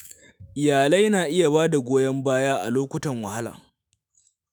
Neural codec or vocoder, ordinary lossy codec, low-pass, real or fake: autoencoder, 48 kHz, 128 numbers a frame, DAC-VAE, trained on Japanese speech; none; none; fake